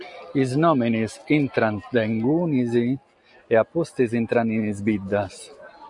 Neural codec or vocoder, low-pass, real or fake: none; 10.8 kHz; real